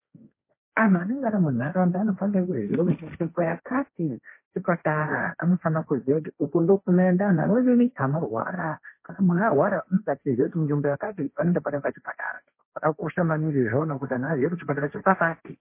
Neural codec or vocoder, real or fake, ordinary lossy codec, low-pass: codec, 16 kHz, 1.1 kbps, Voila-Tokenizer; fake; MP3, 24 kbps; 3.6 kHz